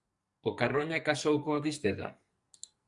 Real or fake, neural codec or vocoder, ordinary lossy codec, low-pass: fake; codec, 44.1 kHz, 2.6 kbps, SNAC; Opus, 64 kbps; 10.8 kHz